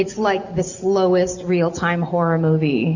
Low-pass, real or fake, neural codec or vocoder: 7.2 kHz; real; none